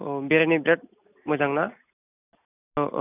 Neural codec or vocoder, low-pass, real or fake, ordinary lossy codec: none; 3.6 kHz; real; none